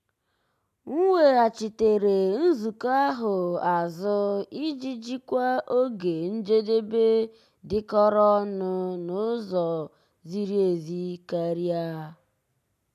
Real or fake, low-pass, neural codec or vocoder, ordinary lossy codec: real; 14.4 kHz; none; none